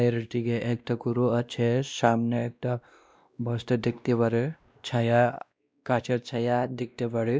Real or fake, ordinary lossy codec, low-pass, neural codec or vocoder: fake; none; none; codec, 16 kHz, 1 kbps, X-Codec, WavLM features, trained on Multilingual LibriSpeech